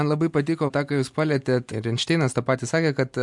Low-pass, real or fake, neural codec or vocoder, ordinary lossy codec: 10.8 kHz; real; none; MP3, 64 kbps